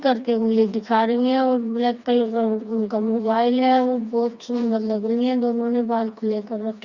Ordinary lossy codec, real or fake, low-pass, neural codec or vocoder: Opus, 64 kbps; fake; 7.2 kHz; codec, 16 kHz, 2 kbps, FreqCodec, smaller model